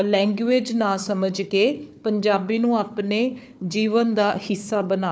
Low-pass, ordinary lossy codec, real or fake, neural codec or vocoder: none; none; fake; codec, 16 kHz, 4 kbps, FunCodec, trained on Chinese and English, 50 frames a second